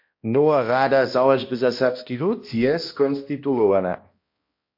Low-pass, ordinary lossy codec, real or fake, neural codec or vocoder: 5.4 kHz; MP3, 32 kbps; fake; codec, 16 kHz, 1 kbps, X-Codec, HuBERT features, trained on balanced general audio